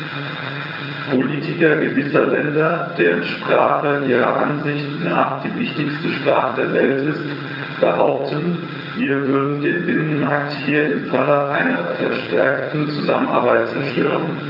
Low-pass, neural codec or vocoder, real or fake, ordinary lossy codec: 5.4 kHz; vocoder, 22.05 kHz, 80 mel bands, HiFi-GAN; fake; none